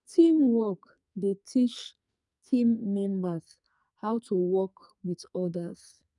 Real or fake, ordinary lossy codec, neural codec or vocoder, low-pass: fake; none; codec, 44.1 kHz, 2.6 kbps, SNAC; 10.8 kHz